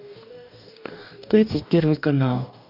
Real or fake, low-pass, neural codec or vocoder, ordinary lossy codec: fake; 5.4 kHz; codec, 44.1 kHz, 2.6 kbps, DAC; none